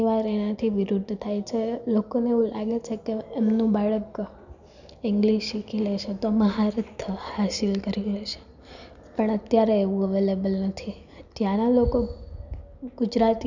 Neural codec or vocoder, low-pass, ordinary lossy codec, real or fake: none; 7.2 kHz; none; real